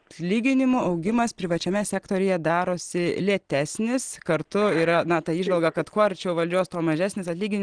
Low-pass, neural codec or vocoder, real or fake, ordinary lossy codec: 9.9 kHz; none; real; Opus, 16 kbps